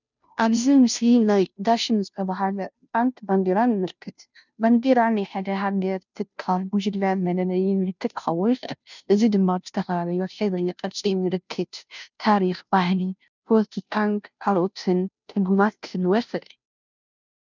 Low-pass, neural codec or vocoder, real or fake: 7.2 kHz; codec, 16 kHz, 0.5 kbps, FunCodec, trained on Chinese and English, 25 frames a second; fake